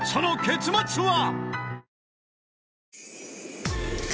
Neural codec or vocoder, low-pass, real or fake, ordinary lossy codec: none; none; real; none